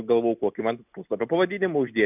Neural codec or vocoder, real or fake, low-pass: none; real; 3.6 kHz